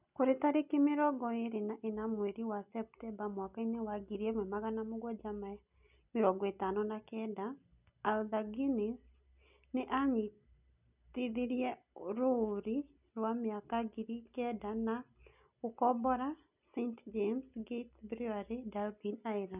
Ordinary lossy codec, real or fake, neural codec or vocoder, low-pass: none; real; none; 3.6 kHz